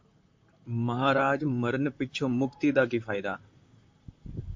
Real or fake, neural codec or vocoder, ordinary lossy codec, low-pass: fake; vocoder, 44.1 kHz, 128 mel bands, Pupu-Vocoder; MP3, 48 kbps; 7.2 kHz